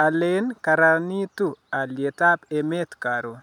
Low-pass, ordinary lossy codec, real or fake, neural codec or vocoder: 19.8 kHz; none; real; none